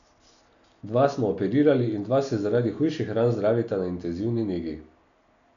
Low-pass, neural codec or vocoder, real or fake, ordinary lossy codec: 7.2 kHz; none; real; none